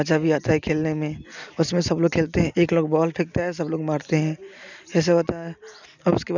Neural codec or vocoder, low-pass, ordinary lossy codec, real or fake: none; 7.2 kHz; none; real